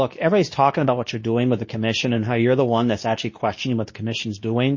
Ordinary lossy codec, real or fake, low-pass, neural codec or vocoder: MP3, 32 kbps; fake; 7.2 kHz; codec, 24 kHz, 0.9 kbps, WavTokenizer, medium speech release version 2